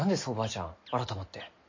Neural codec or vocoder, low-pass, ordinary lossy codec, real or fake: none; 7.2 kHz; MP3, 32 kbps; real